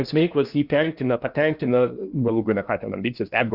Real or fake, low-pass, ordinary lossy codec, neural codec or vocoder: fake; 5.4 kHz; Opus, 64 kbps; codec, 16 kHz in and 24 kHz out, 0.8 kbps, FocalCodec, streaming, 65536 codes